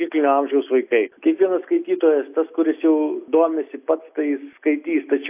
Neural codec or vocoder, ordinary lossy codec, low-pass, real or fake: none; AAC, 32 kbps; 3.6 kHz; real